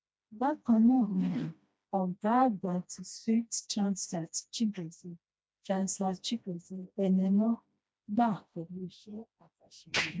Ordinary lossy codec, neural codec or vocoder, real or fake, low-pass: none; codec, 16 kHz, 1 kbps, FreqCodec, smaller model; fake; none